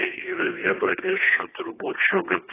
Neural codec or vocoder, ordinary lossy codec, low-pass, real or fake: codec, 24 kHz, 1.5 kbps, HILCodec; AAC, 16 kbps; 3.6 kHz; fake